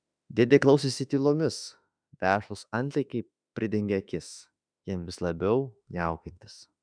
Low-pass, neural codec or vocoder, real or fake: 9.9 kHz; autoencoder, 48 kHz, 32 numbers a frame, DAC-VAE, trained on Japanese speech; fake